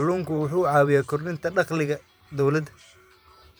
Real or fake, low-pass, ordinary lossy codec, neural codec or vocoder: fake; none; none; vocoder, 44.1 kHz, 128 mel bands every 512 samples, BigVGAN v2